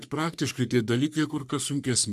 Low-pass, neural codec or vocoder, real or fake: 14.4 kHz; codec, 44.1 kHz, 3.4 kbps, Pupu-Codec; fake